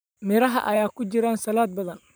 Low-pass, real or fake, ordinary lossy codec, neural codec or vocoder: none; fake; none; vocoder, 44.1 kHz, 128 mel bands every 512 samples, BigVGAN v2